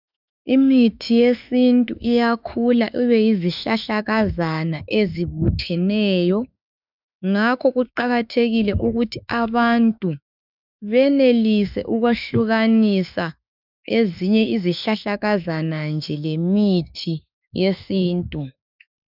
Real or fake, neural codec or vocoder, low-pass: fake; autoencoder, 48 kHz, 32 numbers a frame, DAC-VAE, trained on Japanese speech; 5.4 kHz